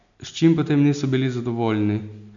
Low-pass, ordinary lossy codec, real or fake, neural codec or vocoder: 7.2 kHz; none; real; none